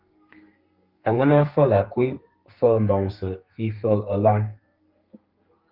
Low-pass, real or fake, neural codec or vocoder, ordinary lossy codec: 5.4 kHz; fake; codec, 32 kHz, 1.9 kbps, SNAC; Opus, 32 kbps